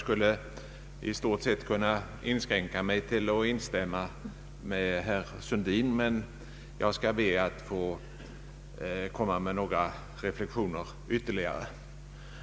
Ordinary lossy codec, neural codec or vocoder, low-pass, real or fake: none; none; none; real